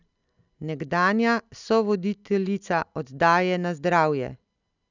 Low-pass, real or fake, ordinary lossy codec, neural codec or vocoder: 7.2 kHz; real; none; none